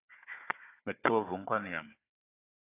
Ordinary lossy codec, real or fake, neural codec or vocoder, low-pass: AAC, 24 kbps; fake; codec, 16 kHz, 4 kbps, FunCodec, trained on Chinese and English, 50 frames a second; 3.6 kHz